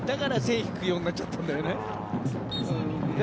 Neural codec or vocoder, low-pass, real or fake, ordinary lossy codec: none; none; real; none